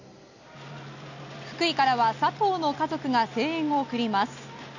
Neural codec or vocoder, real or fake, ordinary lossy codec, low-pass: none; real; none; 7.2 kHz